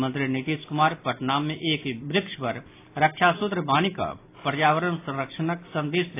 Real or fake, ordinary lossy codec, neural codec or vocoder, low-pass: real; AAC, 24 kbps; none; 3.6 kHz